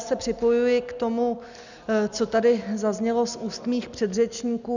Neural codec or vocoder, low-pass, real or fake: none; 7.2 kHz; real